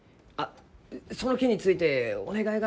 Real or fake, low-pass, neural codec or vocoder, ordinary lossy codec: real; none; none; none